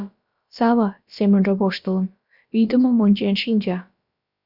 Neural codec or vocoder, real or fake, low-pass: codec, 16 kHz, about 1 kbps, DyCAST, with the encoder's durations; fake; 5.4 kHz